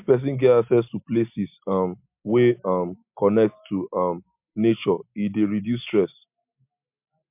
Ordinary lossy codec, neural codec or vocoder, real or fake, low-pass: MP3, 32 kbps; none; real; 3.6 kHz